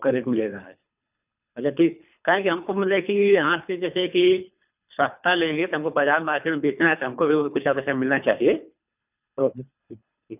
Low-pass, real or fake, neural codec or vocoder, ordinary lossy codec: 3.6 kHz; fake; codec, 24 kHz, 3 kbps, HILCodec; none